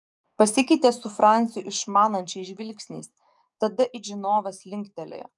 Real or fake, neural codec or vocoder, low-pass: fake; codec, 44.1 kHz, 7.8 kbps, DAC; 10.8 kHz